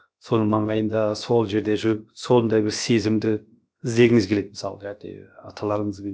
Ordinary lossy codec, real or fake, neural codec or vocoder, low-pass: none; fake; codec, 16 kHz, about 1 kbps, DyCAST, with the encoder's durations; none